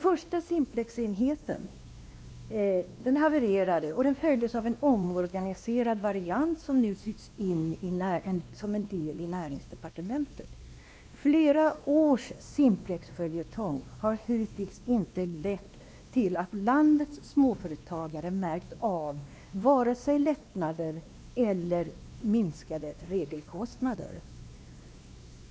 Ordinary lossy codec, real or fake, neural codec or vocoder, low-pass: none; fake; codec, 16 kHz, 2 kbps, X-Codec, WavLM features, trained on Multilingual LibriSpeech; none